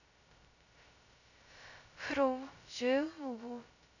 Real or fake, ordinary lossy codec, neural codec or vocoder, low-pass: fake; none; codec, 16 kHz, 0.2 kbps, FocalCodec; 7.2 kHz